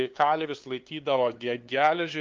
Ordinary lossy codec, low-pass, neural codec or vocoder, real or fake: Opus, 32 kbps; 7.2 kHz; codec, 16 kHz, 2 kbps, FunCodec, trained on LibriTTS, 25 frames a second; fake